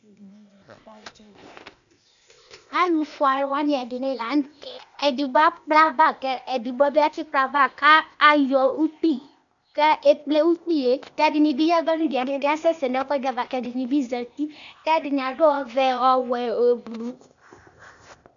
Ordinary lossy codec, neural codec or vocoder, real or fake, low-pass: MP3, 96 kbps; codec, 16 kHz, 0.8 kbps, ZipCodec; fake; 7.2 kHz